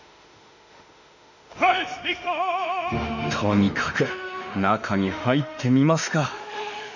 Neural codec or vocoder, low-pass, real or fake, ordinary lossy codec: autoencoder, 48 kHz, 32 numbers a frame, DAC-VAE, trained on Japanese speech; 7.2 kHz; fake; none